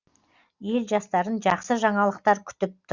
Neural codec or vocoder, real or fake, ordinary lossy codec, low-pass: none; real; Opus, 64 kbps; 7.2 kHz